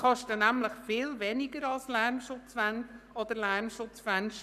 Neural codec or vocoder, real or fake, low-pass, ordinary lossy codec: codec, 44.1 kHz, 7.8 kbps, Pupu-Codec; fake; 14.4 kHz; none